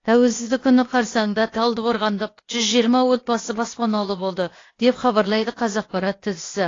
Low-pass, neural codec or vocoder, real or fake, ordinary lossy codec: 7.2 kHz; codec, 16 kHz, 0.8 kbps, ZipCodec; fake; AAC, 32 kbps